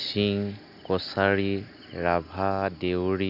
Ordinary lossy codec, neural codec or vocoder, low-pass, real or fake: none; none; 5.4 kHz; real